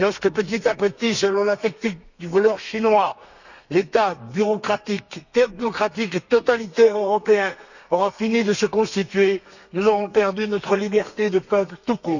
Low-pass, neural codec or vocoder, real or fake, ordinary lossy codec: 7.2 kHz; codec, 32 kHz, 1.9 kbps, SNAC; fake; none